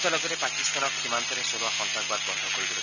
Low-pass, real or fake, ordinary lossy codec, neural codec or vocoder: 7.2 kHz; real; none; none